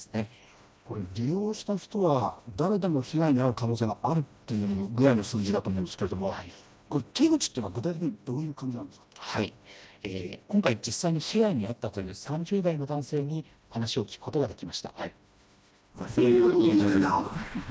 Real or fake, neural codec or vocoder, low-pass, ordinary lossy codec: fake; codec, 16 kHz, 1 kbps, FreqCodec, smaller model; none; none